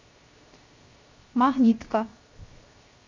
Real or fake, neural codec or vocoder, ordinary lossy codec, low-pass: fake; codec, 16 kHz, 0.7 kbps, FocalCodec; MP3, 48 kbps; 7.2 kHz